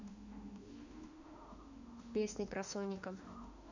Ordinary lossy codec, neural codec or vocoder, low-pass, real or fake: none; autoencoder, 48 kHz, 32 numbers a frame, DAC-VAE, trained on Japanese speech; 7.2 kHz; fake